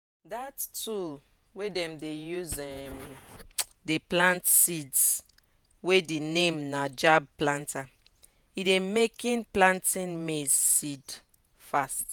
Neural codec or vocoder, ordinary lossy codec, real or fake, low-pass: vocoder, 48 kHz, 128 mel bands, Vocos; none; fake; none